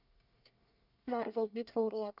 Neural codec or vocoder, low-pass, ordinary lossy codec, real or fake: codec, 24 kHz, 1 kbps, SNAC; 5.4 kHz; none; fake